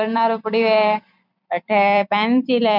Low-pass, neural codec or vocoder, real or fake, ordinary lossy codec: 5.4 kHz; vocoder, 44.1 kHz, 128 mel bands every 256 samples, BigVGAN v2; fake; none